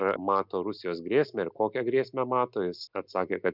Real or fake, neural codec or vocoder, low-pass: real; none; 5.4 kHz